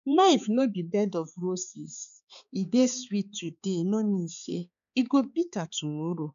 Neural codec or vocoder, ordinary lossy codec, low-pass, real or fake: codec, 16 kHz, 4 kbps, X-Codec, HuBERT features, trained on balanced general audio; none; 7.2 kHz; fake